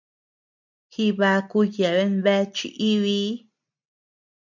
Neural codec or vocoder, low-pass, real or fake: none; 7.2 kHz; real